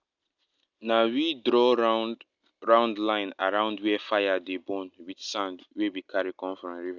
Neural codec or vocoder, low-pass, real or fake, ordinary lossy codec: none; 7.2 kHz; real; none